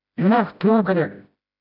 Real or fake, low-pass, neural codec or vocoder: fake; 5.4 kHz; codec, 16 kHz, 0.5 kbps, FreqCodec, smaller model